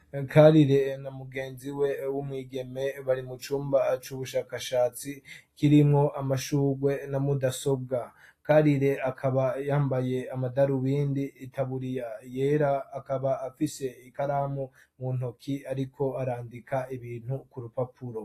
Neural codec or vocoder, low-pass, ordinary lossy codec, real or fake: none; 14.4 kHz; AAC, 48 kbps; real